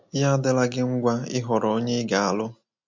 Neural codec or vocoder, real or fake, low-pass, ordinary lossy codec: none; real; 7.2 kHz; MP3, 48 kbps